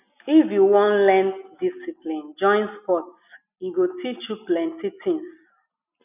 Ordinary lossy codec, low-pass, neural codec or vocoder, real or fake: none; 3.6 kHz; none; real